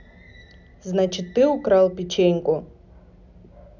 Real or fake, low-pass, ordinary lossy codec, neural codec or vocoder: real; 7.2 kHz; none; none